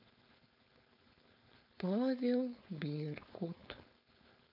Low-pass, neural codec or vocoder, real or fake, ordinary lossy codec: 5.4 kHz; codec, 16 kHz, 4.8 kbps, FACodec; fake; none